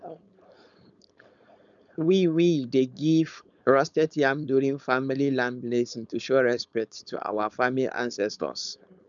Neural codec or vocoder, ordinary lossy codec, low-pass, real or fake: codec, 16 kHz, 4.8 kbps, FACodec; none; 7.2 kHz; fake